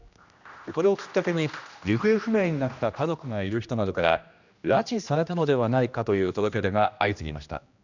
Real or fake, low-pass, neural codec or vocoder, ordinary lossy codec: fake; 7.2 kHz; codec, 16 kHz, 1 kbps, X-Codec, HuBERT features, trained on general audio; none